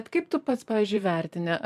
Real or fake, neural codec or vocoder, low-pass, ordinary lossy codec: fake; vocoder, 44.1 kHz, 128 mel bands every 512 samples, BigVGAN v2; 14.4 kHz; MP3, 96 kbps